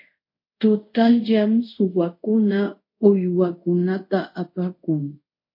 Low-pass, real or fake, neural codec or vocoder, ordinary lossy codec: 5.4 kHz; fake; codec, 24 kHz, 0.5 kbps, DualCodec; MP3, 32 kbps